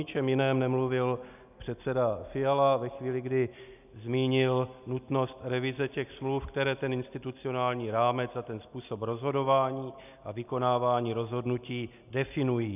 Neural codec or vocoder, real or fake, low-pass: none; real; 3.6 kHz